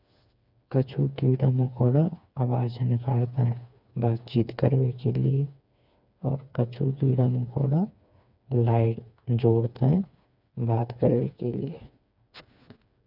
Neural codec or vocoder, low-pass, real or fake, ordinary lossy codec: codec, 16 kHz, 4 kbps, FreqCodec, smaller model; 5.4 kHz; fake; none